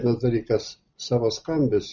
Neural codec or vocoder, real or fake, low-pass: none; real; 7.2 kHz